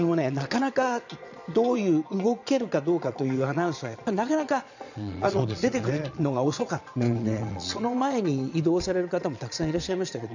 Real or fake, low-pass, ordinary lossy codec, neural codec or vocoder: fake; 7.2 kHz; none; vocoder, 22.05 kHz, 80 mel bands, Vocos